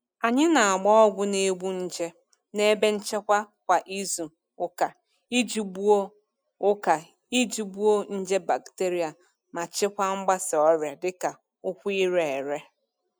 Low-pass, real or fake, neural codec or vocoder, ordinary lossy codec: none; real; none; none